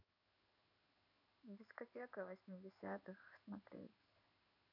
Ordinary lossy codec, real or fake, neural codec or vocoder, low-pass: none; fake; codec, 16 kHz in and 24 kHz out, 1 kbps, XY-Tokenizer; 5.4 kHz